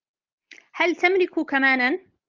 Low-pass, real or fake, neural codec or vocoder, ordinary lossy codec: 7.2 kHz; real; none; Opus, 32 kbps